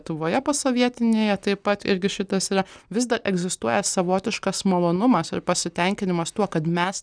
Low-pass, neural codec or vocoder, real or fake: 9.9 kHz; none; real